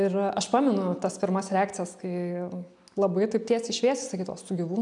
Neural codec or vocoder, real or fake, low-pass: none; real; 10.8 kHz